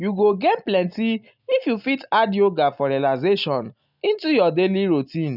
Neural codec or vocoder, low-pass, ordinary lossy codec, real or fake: none; 5.4 kHz; none; real